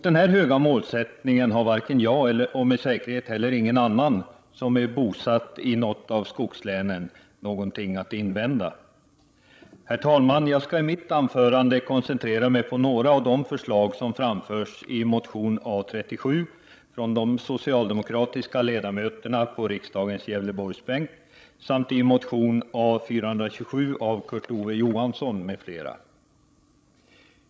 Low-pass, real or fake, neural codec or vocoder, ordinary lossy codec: none; fake; codec, 16 kHz, 16 kbps, FreqCodec, larger model; none